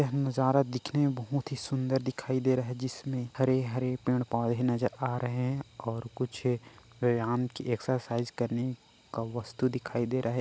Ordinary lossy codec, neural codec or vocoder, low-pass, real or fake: none; none; none; real